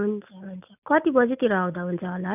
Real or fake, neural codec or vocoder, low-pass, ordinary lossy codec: real; none; 3.6 kHz; none